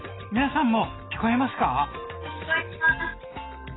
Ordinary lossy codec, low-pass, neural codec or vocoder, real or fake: AAC, 16 kbps; 7.2 kHz; none; real